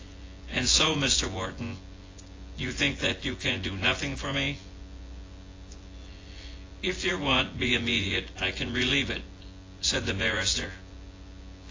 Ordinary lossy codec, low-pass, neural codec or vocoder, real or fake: AAC, 32 kbps; 7.2 kHz; vocoder, 24 kHz, 100 mel bands, Vocos; fake